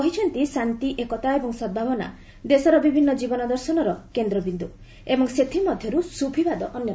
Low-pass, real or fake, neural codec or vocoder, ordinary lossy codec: none; real; none; none